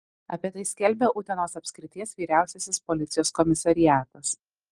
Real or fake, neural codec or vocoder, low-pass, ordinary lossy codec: real; none; 10.8 kHz; Opus, 24 kbps